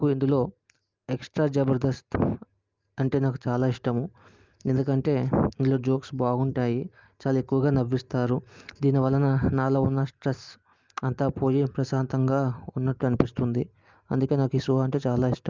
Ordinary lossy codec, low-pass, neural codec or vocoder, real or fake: Opus, 24 kbps; 7.2 kHz; none; real